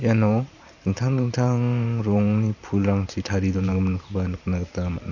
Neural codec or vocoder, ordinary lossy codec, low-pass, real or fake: vocoder, 44.1 kHz, 128 mel bands every 512 samples, BigVGAN v2; none; 7.2 kHz; fake